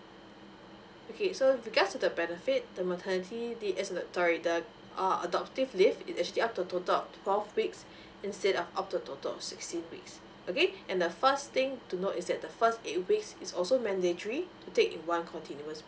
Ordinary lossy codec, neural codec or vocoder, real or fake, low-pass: none; none; real; none